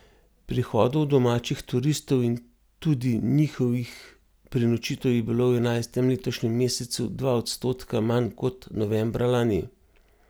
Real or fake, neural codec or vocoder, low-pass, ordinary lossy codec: real; none; none; none